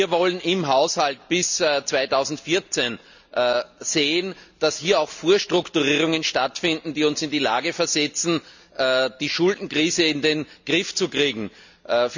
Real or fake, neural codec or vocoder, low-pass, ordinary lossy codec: real; none; 7.2 kHz; none